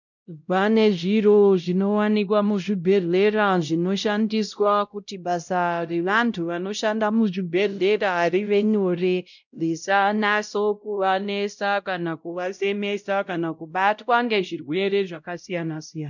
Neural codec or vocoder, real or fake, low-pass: codec, 16 kHz, 0.5 kbps, X-Codec, WavLM features, trained on Multilingual LibriSpeech; fake; 7.2 kHz